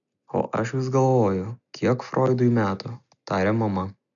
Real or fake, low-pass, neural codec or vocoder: real; 7.2 kHz; none